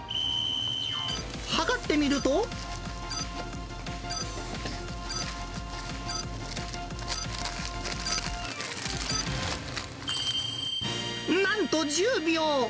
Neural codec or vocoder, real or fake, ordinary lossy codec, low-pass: none; real; none; none